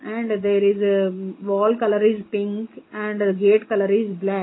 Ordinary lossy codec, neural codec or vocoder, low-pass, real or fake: AAC, 16 kbps; none; 7.2 kHz; real